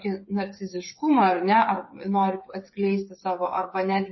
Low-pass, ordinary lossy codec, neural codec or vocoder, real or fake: 7.2 kHz; MP3, 24 kbps; codec, 16 kHz, 8 kbps, FreqCodec, smaller model; fake